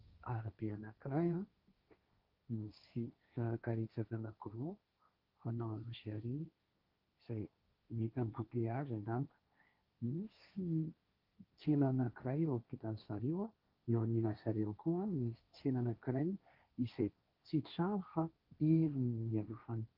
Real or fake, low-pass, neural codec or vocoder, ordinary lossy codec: fake; 5.4 kHz; codec, 16 kHz, 1.1 kbps, Voila-Tokenizer; Opus, 24 kbps